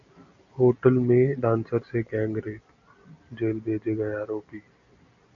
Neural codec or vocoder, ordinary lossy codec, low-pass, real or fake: none; MP3, 96 kbps; 7.2 kHz; real